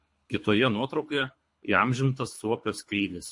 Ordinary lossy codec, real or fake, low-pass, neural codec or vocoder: MP3, 48 kbps; fake; 10.8 kHz; codec, 24 kHz, 3 kbps, HILCodec